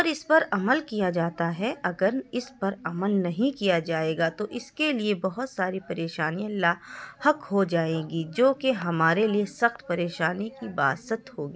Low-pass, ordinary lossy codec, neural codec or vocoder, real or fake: none; none; none; real